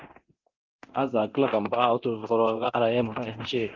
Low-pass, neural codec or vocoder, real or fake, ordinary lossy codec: 7.2 kHz; codec, 24 kHz, 0.9 kbps, DualCodec; fake; Opus, 32 kbps